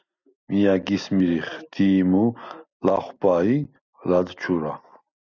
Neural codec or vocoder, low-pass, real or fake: none; 7.2 kHz; real